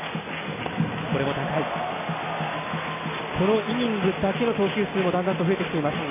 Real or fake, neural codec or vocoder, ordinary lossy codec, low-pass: real; none; MP3, 16 kbps; 3.6 kHz